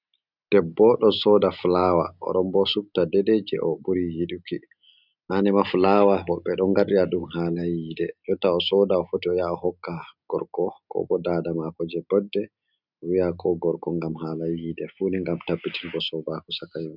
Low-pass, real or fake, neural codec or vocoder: 5.4 kHz; real; none